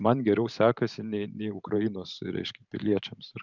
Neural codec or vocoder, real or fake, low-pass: vocoder, 44.1 kHz, 128 mel bands every 512 samples, BigVGAN v2; fake; 7.2 kHz